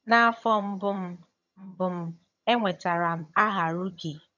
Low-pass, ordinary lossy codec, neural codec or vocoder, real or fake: 7.2 kHz; none; vocoder, 22.05 kHz, 80 mel bands, HiFi-GAN; fake